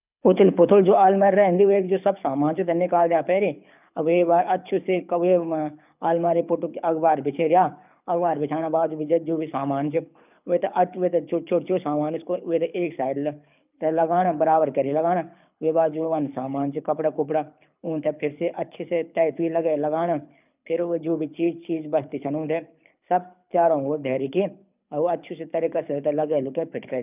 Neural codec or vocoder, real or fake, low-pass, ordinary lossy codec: codec, 24 kHz, 6 kbps, HILCodec; fake; 3.6 kHz; none